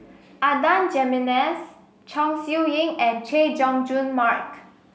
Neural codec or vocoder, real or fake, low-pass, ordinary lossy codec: none; real; none; none